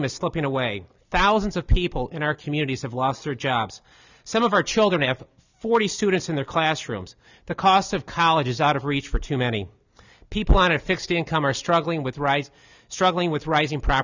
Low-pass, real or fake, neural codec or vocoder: 7.2 kHz; real; none